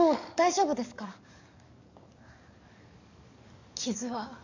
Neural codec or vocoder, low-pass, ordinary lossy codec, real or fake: codec, 44.1 kHz, 7.8 kbps, DAC; 7.2 kHz; none; fake